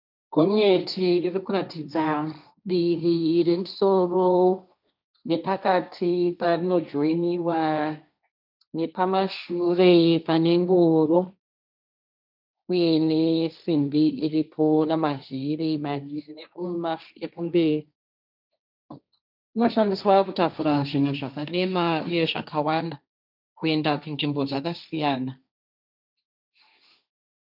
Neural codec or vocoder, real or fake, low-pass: codec, 16 kHz, 1.1 kbps, Voila-Tokenizer; fake; 5.4 kHz